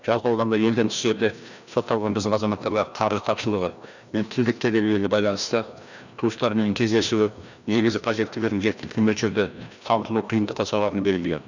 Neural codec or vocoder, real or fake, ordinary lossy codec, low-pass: codec, 16 kHz, 1 kbps, FreqCodec, larger model; fake; none; 7.2 kHz